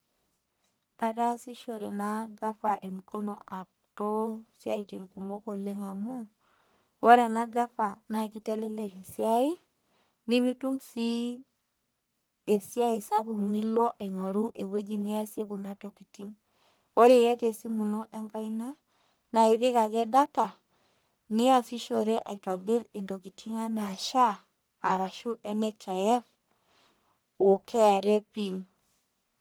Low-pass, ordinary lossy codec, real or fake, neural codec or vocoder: none; none; fake; codec, 44.1 kHz, 1.7 kbps, Pupu-Codec